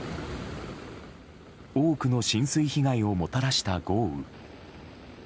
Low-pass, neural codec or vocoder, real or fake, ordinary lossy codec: none; none; real; none